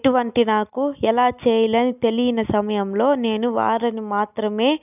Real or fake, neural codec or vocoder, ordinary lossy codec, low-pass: real; none; none; 3.6 kHz